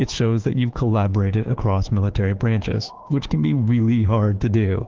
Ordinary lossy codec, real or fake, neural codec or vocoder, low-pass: Opus, 16 kbps; fake; autoencoder, 48 kHz, 32 numbers a frame, DAC-VAE, trained on Japanese speech; 7.2 kHz